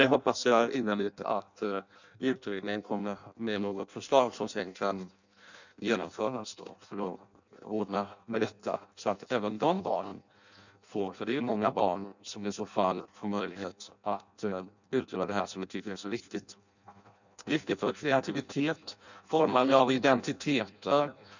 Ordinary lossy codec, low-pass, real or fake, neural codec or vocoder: none; 7.2 kHz; fake; codec, 16 kHz in and 24 kHz out, 0.6 kbps, FireRedTTS-2 codec